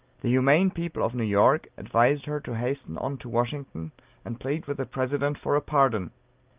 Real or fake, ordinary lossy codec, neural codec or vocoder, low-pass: real; Opus, 24 kbps; none; 3.6 kHz